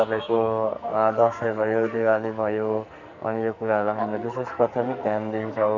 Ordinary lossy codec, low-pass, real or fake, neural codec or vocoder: none; 7.2 kHz; fake; codec, 44.1 kHz, 2.6 kbps, SNAC